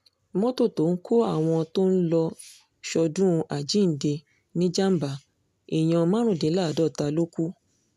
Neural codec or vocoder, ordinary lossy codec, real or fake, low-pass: none; none; real; 14.4 kHz